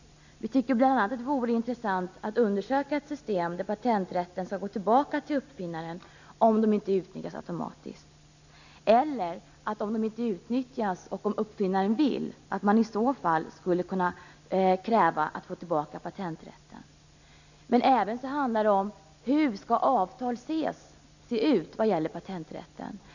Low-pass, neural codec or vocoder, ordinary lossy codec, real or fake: 7.2 kHz; none; none; real